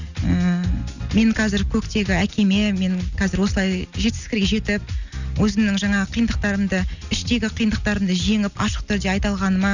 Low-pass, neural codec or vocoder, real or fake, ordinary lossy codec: 7.2 kHz; none; real; none